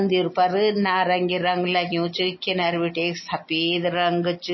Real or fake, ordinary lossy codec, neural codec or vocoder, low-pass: real; MP3, 24 kbps; none; 7.2 kHz